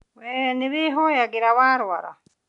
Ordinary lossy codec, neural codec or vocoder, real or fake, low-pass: none; none; real; 10.8 kHz